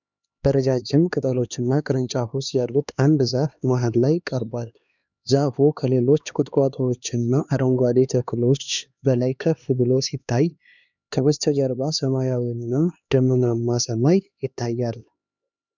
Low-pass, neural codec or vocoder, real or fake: 7.2 kHz; codec, 16 kHz, 2 kbps, X-Codec, HuBERT features, trained on LibriSpeech; fake